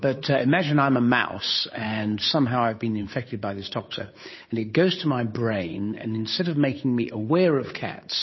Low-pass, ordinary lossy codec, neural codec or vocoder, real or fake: 7.2 kHz; MP3, 24 kbps; codec, 16 kHz, 8 kbps, FunCodec, trained on Chinese and English, 25 frames a second; fake